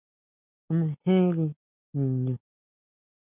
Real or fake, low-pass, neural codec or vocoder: real; 3.6 kHz; none